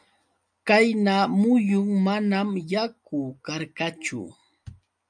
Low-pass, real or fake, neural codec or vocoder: 9.9 kHz; real; none